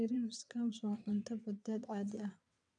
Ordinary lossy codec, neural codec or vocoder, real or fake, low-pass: none; vocoder, 22.05 kHz, 80 mel bands, WaveNeXt; fake; 9.9 kHz